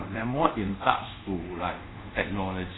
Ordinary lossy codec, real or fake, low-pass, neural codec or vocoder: AAC, 16 kbps; fake; 7.2 kHz; codec, 24 kHz, 0.5 kbps, DualCodec